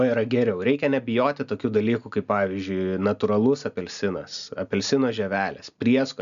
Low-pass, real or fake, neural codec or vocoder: 7.2 kHz; real; none